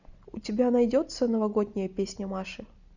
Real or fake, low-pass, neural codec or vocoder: real; 7.2 kHz; none